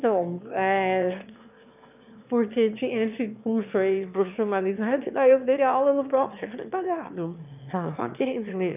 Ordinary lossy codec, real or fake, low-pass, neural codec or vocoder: none; fake; 3.6 kHz; autoencoder, 22.05 kHz, a latent of 192 numbers a frame, VITS, trained on one speaker